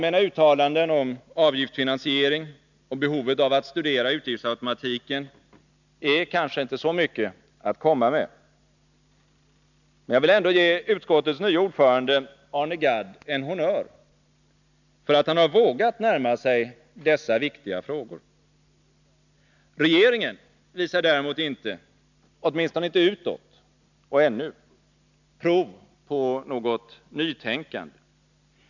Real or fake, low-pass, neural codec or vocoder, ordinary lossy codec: real; 7.2 kHz; none; none